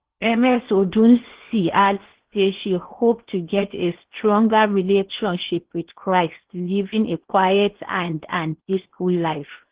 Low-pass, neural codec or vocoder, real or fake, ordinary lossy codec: 3.6 kHz; codec, 16 kHz in and 24 kHz out, 0.8 kbps, FocalCodec, streaming, 65536 codes; fake; Opus, 16 kbps